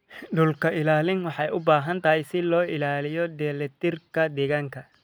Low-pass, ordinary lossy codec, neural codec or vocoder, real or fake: none; none; none; real